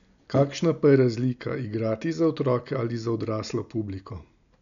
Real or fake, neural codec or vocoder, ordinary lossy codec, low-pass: real; none; none; 7.2 kHz